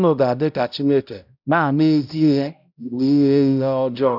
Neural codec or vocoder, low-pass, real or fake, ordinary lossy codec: codec, 16 kHz, 0.5 kbps, X-Codec, HuBERT features, trained on balanced general audio; 5.4 kHz; fake; none